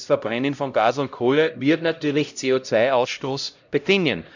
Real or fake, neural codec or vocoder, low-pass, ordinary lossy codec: fake; codec, 16 kHz, 0.5 kbps, X-Codec, HuBERT features, trained on LibriSpeech; 7.2 kHz; none